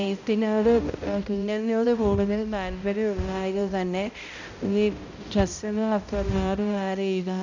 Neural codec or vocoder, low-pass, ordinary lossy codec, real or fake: codec, 16 kHz, 0.5 kbps, X-Codec, HuBERT features, trained on balanced general audio; 7.2 kHz; none; fake